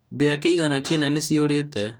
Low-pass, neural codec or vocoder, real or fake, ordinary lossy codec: none; codec, 44.1 kHz, 2.6 kbps, DAC; fake; none